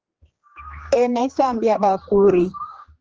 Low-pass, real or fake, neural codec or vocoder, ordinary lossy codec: 7.2 kHz; fake; codec, 16 kHz, 2 kbps, X-Codec, HuBERT features, trained on general audio; Opus, 16 kbps